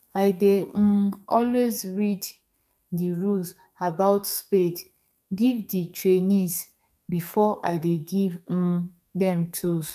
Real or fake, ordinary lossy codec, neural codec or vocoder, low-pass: fake; none; codec, 32 kHz, 1.9 kbps, SNAC; 14.4 kHz